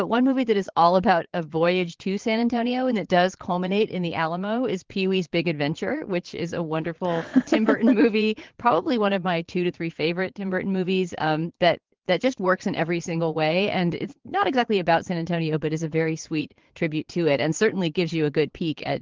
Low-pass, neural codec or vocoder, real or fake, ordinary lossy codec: 7.2 kHz; vocoder, 22.05 kHz, 80 mel bands, Vocos; fake; Opus, 16 kbps